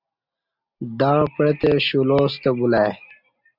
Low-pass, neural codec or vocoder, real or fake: 5.4 kHz; none; real